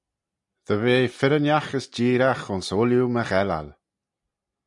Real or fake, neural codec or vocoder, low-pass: real; none; 10.8 kHz